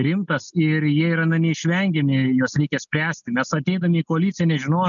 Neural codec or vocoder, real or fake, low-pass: none; real; 7.2 kHz